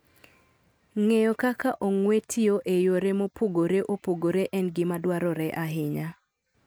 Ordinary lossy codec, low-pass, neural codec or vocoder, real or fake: none; none; none; real